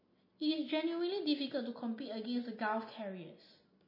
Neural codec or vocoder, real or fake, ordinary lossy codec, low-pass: none; real; MP3, 24 kbps; 5.4 kHz